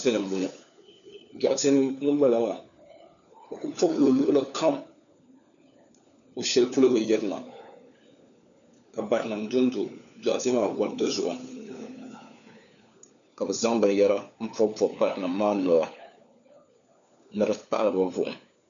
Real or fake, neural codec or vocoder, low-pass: fake; codec, 16 kHz, 4 kbps, FunCodec, trained on LibriTTS, 50 frames a second; 7.2 kHz